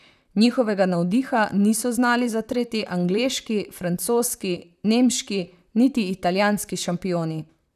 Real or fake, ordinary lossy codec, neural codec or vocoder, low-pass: fake; none; vocoder, 44.1 kHz, 128 mel bands, Pupu-Vocoder; 14.4 kHz